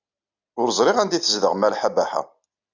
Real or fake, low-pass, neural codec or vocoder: real; 7.2 kHz; none